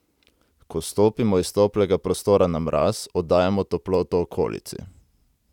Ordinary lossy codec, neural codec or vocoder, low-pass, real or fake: none; none; 19.8 kHz; real